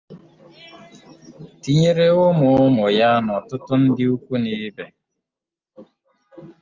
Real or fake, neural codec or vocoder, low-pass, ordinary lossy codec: real; none; 7.2 kHz; Opus, 32 kbps